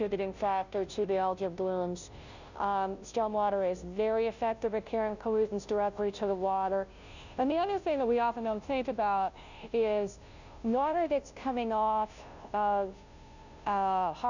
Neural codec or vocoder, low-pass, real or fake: codec, 16 kHz, 0.5 kbps, FunCodec, trained on Chinese and English, 25 frames a second; 7.2 kHz; fake